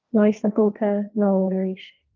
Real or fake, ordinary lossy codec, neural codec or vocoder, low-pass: fake; Opus, 24 kbps; codec, 16 kHz, 1.1 kbps, Voila-Tokenizer; 7.2 kHz